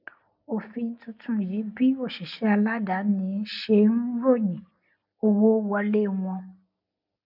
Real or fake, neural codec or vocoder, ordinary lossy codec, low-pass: real; none; none; 5.4 kHz